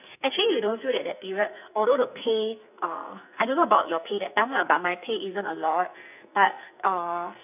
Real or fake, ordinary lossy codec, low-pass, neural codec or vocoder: fake; none; 3.6 kHz; codec, 44.1 kHz, 2.6 kbps, SNAC